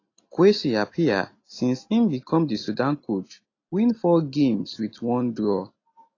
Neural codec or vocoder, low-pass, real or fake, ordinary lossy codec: none; 7.2 kHz; real; AAC, 32 kbps